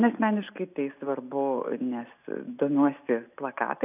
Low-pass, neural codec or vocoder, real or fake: 3.6 kHz; none; real